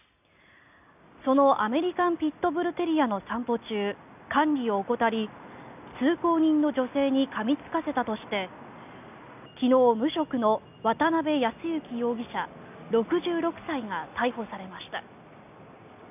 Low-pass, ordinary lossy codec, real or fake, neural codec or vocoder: 3.6 kHz; none; real; none